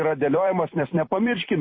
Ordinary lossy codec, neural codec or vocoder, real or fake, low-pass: MP3, 24 kbps; vocoder, 44.1 kHz, 128 mel bands every 256 samples, BigVGAN v2; fake; 7.2 kHz